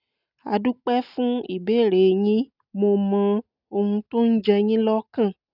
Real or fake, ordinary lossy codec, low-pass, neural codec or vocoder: real; none; 5.4 kHz; none